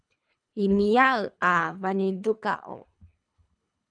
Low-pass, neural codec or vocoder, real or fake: 9.9 kHz; codec, 24 kHz, 3 kbps, HILCodec; fake